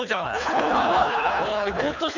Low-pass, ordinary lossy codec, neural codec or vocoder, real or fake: 7.2 kHz; none; codec, 24 kHz, 3 kbps, HILCodec; fake